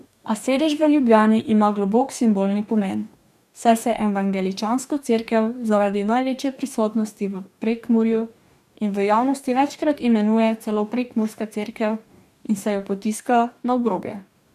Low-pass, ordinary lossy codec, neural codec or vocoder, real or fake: 14.4 kHz; none; codec, 44.1 kHz, 2.6 kbps, DAC; fake